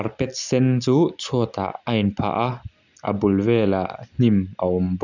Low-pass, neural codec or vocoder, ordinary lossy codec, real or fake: 7.2 kHz; none; none; real